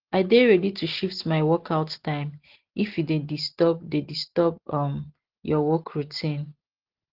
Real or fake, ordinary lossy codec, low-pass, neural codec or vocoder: real; Opus, 16 kbps; 5.4 kHz; none